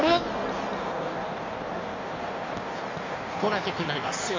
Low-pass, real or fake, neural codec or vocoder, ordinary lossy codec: 7.2 kHz; fake; codec, 16 kHz in and 24 kHz out, 1.1 kbps, FireRedTTS-2 codec; MP3, 64 kbps